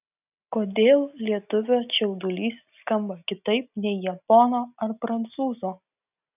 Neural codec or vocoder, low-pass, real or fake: none; 3.6 kHz; real